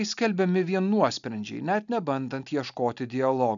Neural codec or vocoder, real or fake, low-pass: none; real; 7.2 kHz